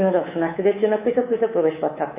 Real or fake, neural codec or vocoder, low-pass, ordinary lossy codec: fake; codec, 24 kHz, 3.1 kbps, DualCodec; 3.6 kHz; AAC, 32 kbps